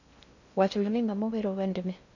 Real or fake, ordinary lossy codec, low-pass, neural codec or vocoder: fake; none; 7.2 kHz; codec, 16 kHz in and 24 kHz out, 0.6 kbps, FocalCodec, streaming, 2048 codes